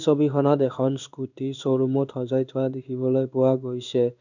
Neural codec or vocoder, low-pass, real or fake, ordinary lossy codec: codec, 16 kHz in and 24 kHz out, 1 kbps, XY-Tokenizer; 7.2 kHz; fake; none